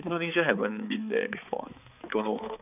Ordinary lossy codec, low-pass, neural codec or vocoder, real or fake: none; 3.6 kHz; codec, 16 kHz, 4 kbps, X-Codec, HuBERT features, trained on balanced general audio; fake